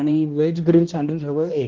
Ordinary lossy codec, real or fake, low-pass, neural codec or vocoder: Opus, 16 kbps; fake; 7.2 kHz; codec, 16 kHz, 1 kbps, X-Codec, HuBERT features, trained on balanced general audio